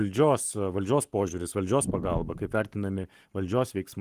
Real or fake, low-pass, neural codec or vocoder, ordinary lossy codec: fake; 14.4 kHz; codec, 44.1 kHz, 7.8 kbps, Pupu-Codec; Opus, 24 kbps